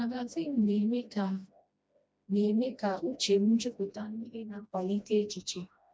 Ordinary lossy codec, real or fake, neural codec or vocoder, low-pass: none; fake; codec, 16 kHz, 1 kbps, FreqCodec, smaller model; none